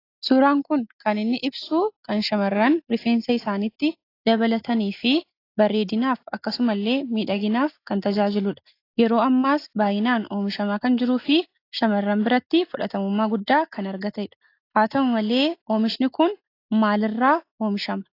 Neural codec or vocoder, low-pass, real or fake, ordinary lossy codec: none; 5.4 kHz; real; AAC, 32 kbps